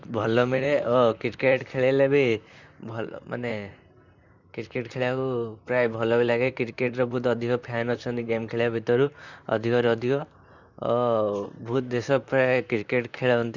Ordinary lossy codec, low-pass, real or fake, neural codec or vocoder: none; 7.2 kHz; fake; vocoder, 44.1 kHz, 128 mel bands, Pupu-Vocoder